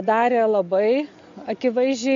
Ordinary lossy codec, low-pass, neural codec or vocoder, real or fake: MP3, 48 kbps; 7.2 kHz; none; real